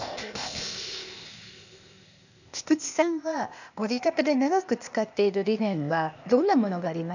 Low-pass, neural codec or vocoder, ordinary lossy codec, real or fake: 7.2 kHz; codec, 16 kHz, 0.8 kbps, ZipCodec; none; fake